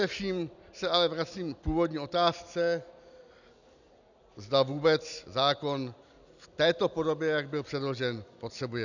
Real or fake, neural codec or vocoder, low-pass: real; none; 7.2 kHz